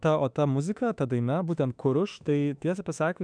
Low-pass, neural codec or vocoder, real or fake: 9.9 kHz; autoencoder, 48 kHz, 32 numbers a frame, DAC-VAE, trained on Japanese speech; fake